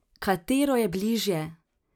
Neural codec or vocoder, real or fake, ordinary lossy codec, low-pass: none; real; none; 19.8 kHz